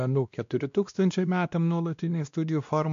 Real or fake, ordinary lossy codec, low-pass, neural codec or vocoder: fake; MP3, 64 kbps; 7.2 kHz; codec, 16 kHz, 1 kbps, X-Codec, WavLM features, trained on Multilingual LibriSpeech